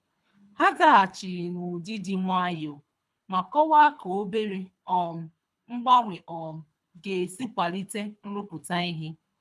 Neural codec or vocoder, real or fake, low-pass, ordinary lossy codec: codec, 24 kHz, 3 kbps, HILCodec; fake; none; none